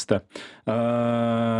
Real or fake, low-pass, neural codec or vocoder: real; 10.8 kHz; none